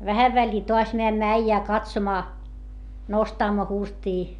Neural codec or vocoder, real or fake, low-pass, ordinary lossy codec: none; real; 10.8 kHz; MP3, 96 kbps